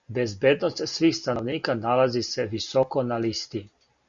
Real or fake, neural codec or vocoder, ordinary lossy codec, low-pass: real; none; Opus, 64 kbps; 7.2 kHz